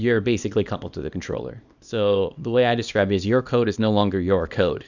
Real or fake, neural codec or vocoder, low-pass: fake; codec, 24 kHz, 0.9 kbps, WavTokenizer, small release; 7.2 kHz